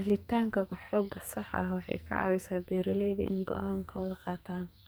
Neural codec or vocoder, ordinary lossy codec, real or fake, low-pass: codec, 44.1 kHz, 2.6 kbps, SNAC; none; fake; none